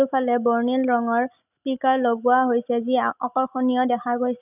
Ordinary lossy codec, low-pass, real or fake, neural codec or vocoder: none; 3.6 kHz; real; none